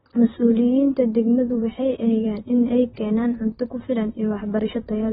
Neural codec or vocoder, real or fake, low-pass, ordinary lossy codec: vocoder, 44.1 kHz, 128 mel bands, Pupu-Vocoder; fake; 19.8 kHz; AAC, 16 kbps